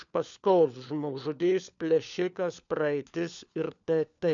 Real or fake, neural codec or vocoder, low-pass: fake; codec, 16 kHz, 4 kbps, FunCodec, trained on LibriTTS, 50 frames a second; 7.2 kHz